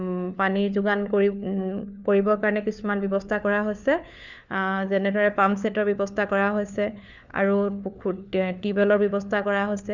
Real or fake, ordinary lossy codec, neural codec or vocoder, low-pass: fake; none; codec, 16 kHz, 4 kbps, FunCodec, trained on LibriTTS, 50 frames a second; 7.2 kHz